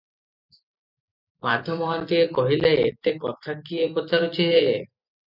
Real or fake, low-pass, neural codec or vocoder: real; 5.4 kHz; none